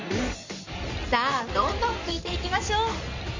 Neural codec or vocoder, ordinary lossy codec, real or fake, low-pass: codec, 16 kHz in and 24 kHz out, 2.2 kbps, FireRedTTS-2 codec; MP3, 48 kbps; fake; 7.2 kHz